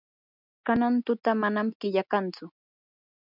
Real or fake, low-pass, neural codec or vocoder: real; 5.4 kHz; none